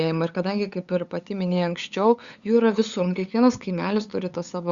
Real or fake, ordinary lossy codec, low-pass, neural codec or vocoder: fake; Opus, 64 kbps; 7.2 kHz; codec, 16 kHz, 8 kbps, FunCodec, trained on LibriTTS, 25 frames a second